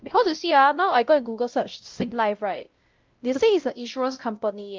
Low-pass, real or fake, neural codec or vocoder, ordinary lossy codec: 7.2 kHz; fake; codec, 16 kHz, 0.5 kbps, X-Codec, WavLM features, trained on Multilingual LibriSpeech; Opus, 24 kbps